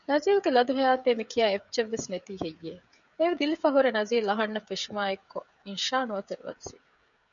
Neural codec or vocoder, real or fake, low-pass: codec, 16 kHz, 16 kbps, FreqCodec, smaller model; fake; 7.2 kHz